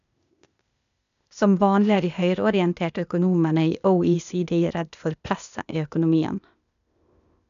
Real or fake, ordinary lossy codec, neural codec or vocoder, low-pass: fake; none; codec, 16 kHz, 0.8 kbps, ZipCodec; 7.2 kHz